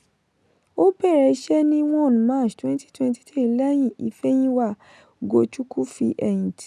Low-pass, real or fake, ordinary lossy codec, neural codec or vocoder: none; real; none; none